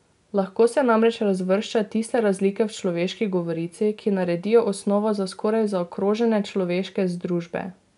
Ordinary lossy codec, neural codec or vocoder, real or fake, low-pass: none; none; real; 10.8 kHz